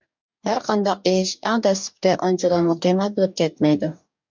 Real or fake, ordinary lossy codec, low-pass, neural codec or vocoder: fake; MP3, 64 kbps; 7.2 kHz; codec, 44.1 kHz, 2.6 kbps, DAC